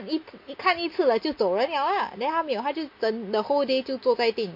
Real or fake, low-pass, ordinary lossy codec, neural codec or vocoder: real; 5.4 kHz; MP3, 32 kbps; none